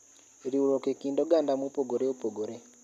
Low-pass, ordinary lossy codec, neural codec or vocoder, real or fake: 14.4 kHz; none; none; real